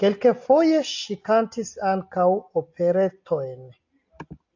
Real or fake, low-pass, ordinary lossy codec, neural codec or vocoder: real; 7.2 kHz; AAC, 48 kbps; none